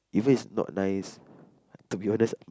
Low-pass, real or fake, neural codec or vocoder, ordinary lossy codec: none; real; none; none